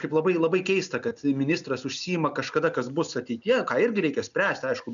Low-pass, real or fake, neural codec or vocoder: 7.2 kHz; real; none